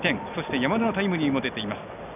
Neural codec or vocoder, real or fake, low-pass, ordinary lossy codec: none; real; 3.6 kHz; none